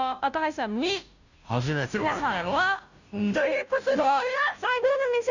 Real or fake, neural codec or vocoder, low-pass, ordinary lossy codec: fake; codec, 16 kHz, 0.5 kbps, FunCodec, trained on Chinese and English, 25 frames a second; 7.2 kHz; none